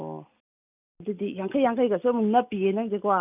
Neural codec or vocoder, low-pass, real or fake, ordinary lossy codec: none; 3.6 kHz; real; none